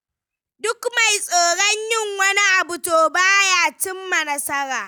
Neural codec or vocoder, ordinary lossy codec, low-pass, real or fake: none; none; none; real